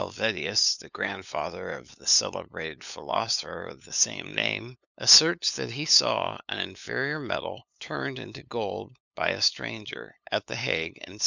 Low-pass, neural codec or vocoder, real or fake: 7.2 kHz; codec, 16 kHz, 8 kbps, FunCodec, trained on LibriTTS, 25 frames a second; fake